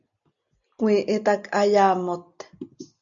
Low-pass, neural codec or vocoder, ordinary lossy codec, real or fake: 7.2 kHz; none; MP3, 96 kbps; real